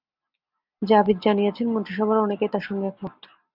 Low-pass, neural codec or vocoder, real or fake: 5.4 kHz; none; real